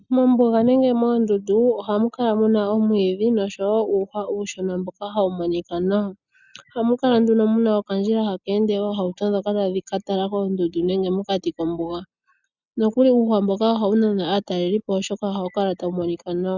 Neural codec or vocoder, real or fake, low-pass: none; real; 7.2 kHz